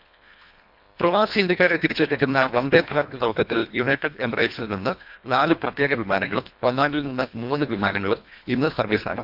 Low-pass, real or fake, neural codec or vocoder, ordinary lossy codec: 5.4 kHz; fake; codec, 24 kHz, 1.5 kbps, HILCodec; none